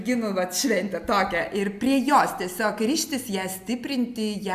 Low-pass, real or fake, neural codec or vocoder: 14.4 kHz; real; none